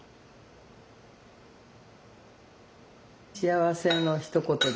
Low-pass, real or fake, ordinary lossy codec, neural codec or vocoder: none; real; none; none